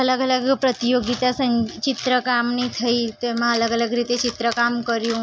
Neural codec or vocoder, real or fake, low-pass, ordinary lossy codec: none; real; none; none